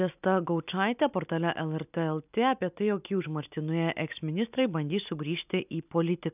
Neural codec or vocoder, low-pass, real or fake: none; 3.6 kHz; real